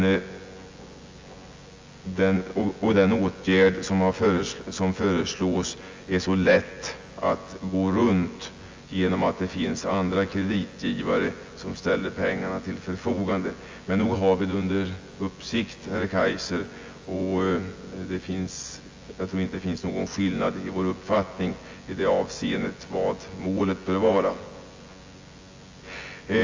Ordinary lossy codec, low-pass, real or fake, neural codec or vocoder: Opus, 32 kbps; 7.2 kHz; fake; vocoder, 24 kHz, 100 mel bands, Vocos